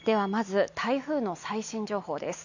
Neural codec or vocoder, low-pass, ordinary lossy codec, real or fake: none; 7.2 kHz; none; real